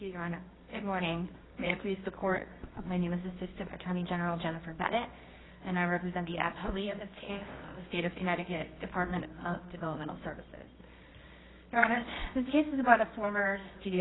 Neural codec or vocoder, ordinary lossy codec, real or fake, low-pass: codec, 24 kHz, 0.9 kbps, WavTokenizer, medium music audio release; AAC, 16 kbps; fake; 7.2 kHz